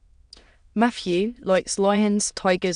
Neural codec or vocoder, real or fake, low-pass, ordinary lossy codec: autoencoder, 22.05 kHz, a latent of 192 numbers a frame, VITS, trained on many speakers; fake; 9.9 kHz; none